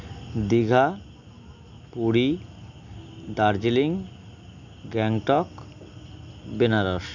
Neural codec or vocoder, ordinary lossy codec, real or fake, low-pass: none; none; real; 7.2 kHz